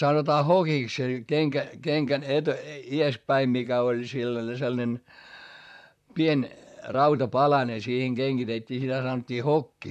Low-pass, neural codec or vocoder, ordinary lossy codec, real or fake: 14.4 kHz; vocoder, 44.1 kHz, 128 mel bands, Pupu-Vocoder; none; fake